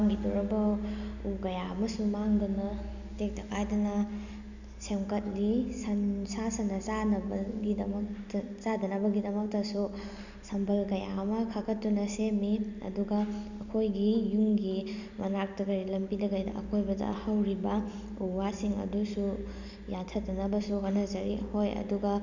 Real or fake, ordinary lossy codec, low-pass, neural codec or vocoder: real; none; 7.2 kHz; none